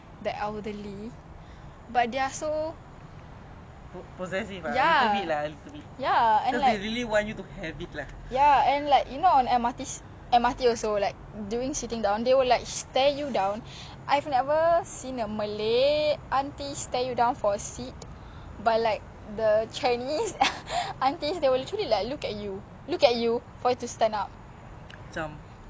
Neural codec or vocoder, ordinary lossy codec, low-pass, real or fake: none; none; none; real